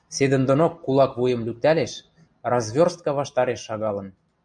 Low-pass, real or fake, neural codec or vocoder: 9.9 kHz; real; none